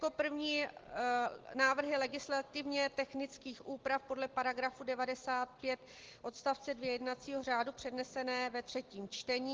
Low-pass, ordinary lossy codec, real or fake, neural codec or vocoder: 7.2 kHz; Opus, 16 kbps; real; none